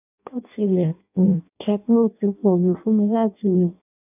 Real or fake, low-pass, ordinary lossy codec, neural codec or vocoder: fake; 3.6 kHz; none; codec, 16 kHz in and 24 kHz out, 0.6 kbps, FireRedTTS-2 codec